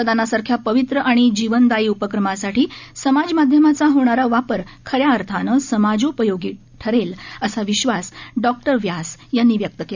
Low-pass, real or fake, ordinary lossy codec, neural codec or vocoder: 7.2 kHz; real; none; none